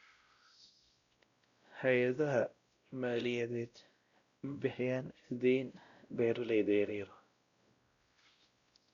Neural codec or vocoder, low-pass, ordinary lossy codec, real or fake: codec, 16 kHz, 0.5 kbps, X-Codec, WavLM features, trained on Multilingual LibriSpeech; 7.2 kHz; Opus, 64 kbps; fake